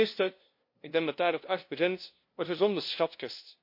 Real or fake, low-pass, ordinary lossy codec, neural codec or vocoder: fake; 5.4 kHz; MP3, 32 kbps; codec, 16 kHz, 0.5 kbps, FunCodec, trained on LibriTTS, 25 frames a second